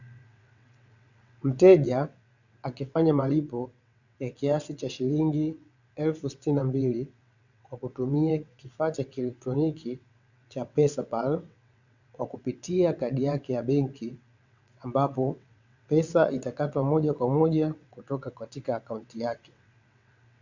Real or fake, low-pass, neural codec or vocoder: fake; 7.2 kHz; vocoder, 22.05 kHz, 80 mel bands, WaveNeXt